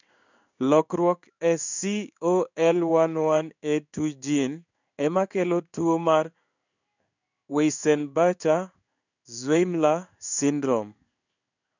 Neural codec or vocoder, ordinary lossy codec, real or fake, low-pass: codec, 16 kHz in and 24 kHz out, 1 kbps, XY-Tokenizer; none; fake; 7.2 kHz